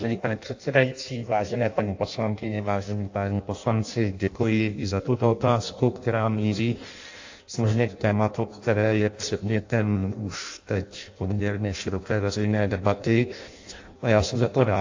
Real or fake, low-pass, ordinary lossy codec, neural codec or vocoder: fake; 7.2 kHz; AAC, 48 kbps; codec, 16 kHz in and 24 kHz out, 0.6 kbps, FireRedTTS-2 codec